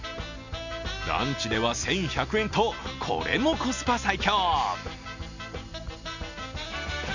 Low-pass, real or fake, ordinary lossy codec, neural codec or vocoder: 7.2 kHz; real; none; none